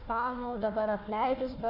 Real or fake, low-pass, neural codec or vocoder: fake; 5.4 kHz; codec, 16 kHz, 1 kbps, FunCodec, trained on Chinese and English, 50 frames a second